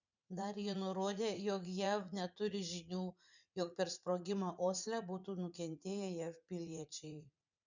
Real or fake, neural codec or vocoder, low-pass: fake; vocoder, 44.1 kHz, 80 mel bands, Vocos; 7.2 kHz